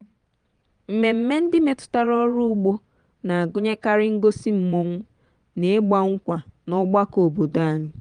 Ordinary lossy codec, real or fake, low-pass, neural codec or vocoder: Opus, 32 kbps; fake; 9.9 kHz; vocoder, 22.05 kHz, 80 mel bands, Vocos